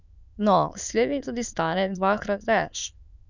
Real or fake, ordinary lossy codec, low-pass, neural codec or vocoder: fake; none; 7.2 kHz; autoencoder, 22.05 kHz, a latent of 192 numbers a frame, VITS, trained on many speakers